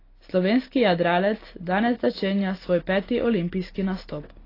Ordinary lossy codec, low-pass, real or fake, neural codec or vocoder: AAC, 24 kbps; 5.4 kHz; real; none